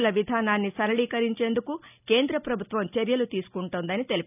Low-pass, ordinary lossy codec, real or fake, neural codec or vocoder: 3.6 kHz; none; real; none